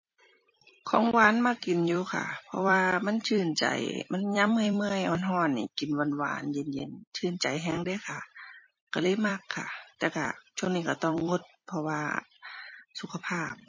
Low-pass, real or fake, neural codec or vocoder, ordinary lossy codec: 7.2 kHz; fake; vocoder, 44.1 kHz, 128 mel bands every 256 samples, BigVGAN v2; MP3, 32 kbps